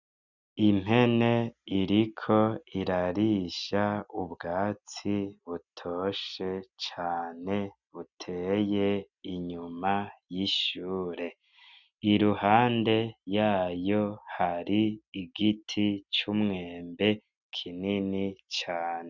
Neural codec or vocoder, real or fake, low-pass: none; real; 7.2 kHz